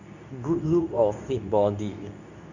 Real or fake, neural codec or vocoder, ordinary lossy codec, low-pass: fake; codec, 24 kHz, 0.9 kbps, WavTokenizer, medium speech release version 2; none; 7.2 kHz